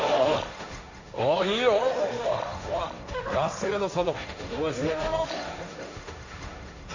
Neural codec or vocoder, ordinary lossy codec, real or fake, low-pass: codec, 16 kHz, 1.1 kbps, Voila-Tokenizer; AAC, 48 kbps; fake; 7.2 kHz